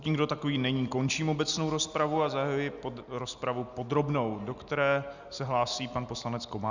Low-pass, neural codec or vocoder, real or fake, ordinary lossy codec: 7.2 kHz; none; real; Opus, 64 kbps